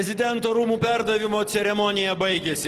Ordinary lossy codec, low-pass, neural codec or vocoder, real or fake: Opus, 16 kbps; 14.4 kHz; none; real